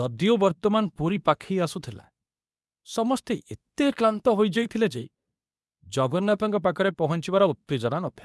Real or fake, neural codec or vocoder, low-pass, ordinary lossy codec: fake; codec, 24 kHz, 0.9 kbps, WavTokenizer, medium speech release version 2; none; none